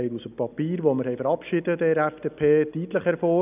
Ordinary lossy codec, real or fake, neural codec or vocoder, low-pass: none; real; none; 3.6 kHz